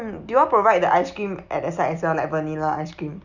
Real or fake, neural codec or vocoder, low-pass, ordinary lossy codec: real; none; 7.2 kHz; none